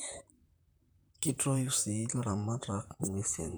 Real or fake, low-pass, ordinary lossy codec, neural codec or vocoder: fake; none; none; vocoder, 44.1 kHz, 128 mel bands, Pupu-Vocoder